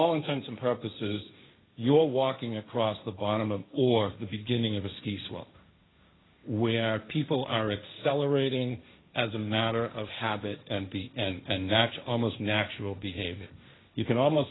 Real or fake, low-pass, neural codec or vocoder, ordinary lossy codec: fake; 7.2 kHz; codec, 16 kHz, 1.1 kbps, Voila-Tokenizer; AAC, 16 kbps